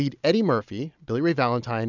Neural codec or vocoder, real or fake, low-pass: none; real; 7.2 kHz